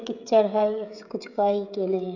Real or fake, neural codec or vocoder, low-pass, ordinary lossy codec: fake; codec, 16 kHz, 16 kbps, FreqCodec, smaller model; 7.2 kHz; none